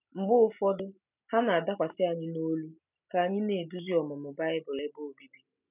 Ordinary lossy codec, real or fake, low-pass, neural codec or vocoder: none; real; 3.6 kHz; none